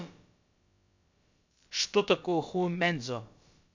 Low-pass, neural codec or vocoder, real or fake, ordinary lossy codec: 7.2 kHz; codec, 16 kHz, about 1 kbps, DyCAST, with the encoder's durations; fake; MP3, 64 kbps